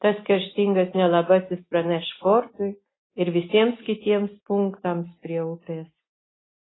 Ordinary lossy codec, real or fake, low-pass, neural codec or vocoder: AAC, 16 kbps; real; 7.2 kHz; none